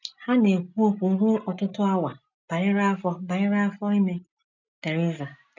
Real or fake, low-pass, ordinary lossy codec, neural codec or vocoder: real; 7.2 kHz; none; none